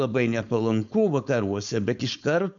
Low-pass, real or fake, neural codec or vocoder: 7.2 kHz; fake; codec, 16 kHz, 4.8 kbps, FACodec